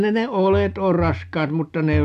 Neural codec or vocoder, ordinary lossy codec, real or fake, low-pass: none; none; real; 14.4 kHz